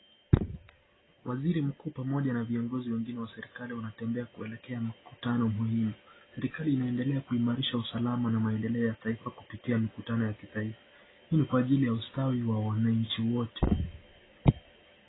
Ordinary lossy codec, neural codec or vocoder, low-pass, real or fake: AAC, 16 kbps; none; 7.2 kHz; real